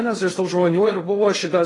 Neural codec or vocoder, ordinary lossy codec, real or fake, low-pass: codec, 16 kHz in and 24 kHz out, 0.6 kbps, FocalCodec, streaming, 2048 codes; AAC, 32 kbps; fake; 10.8 kHz